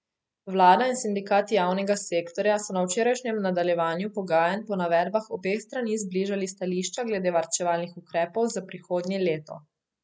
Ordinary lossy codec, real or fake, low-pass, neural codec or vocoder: none; real; none; none